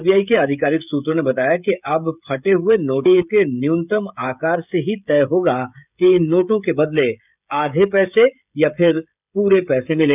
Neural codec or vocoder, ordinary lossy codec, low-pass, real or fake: codec, 16 kHz, 16 kbps, FreqCodec, smaller model; none; 3.6 kHz; fake